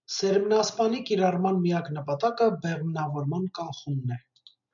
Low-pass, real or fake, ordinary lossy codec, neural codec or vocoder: 7.2 kHz; real; MP3, 96 kbps; none